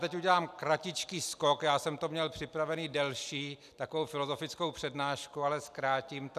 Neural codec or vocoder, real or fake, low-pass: none; real; 14.4 kHz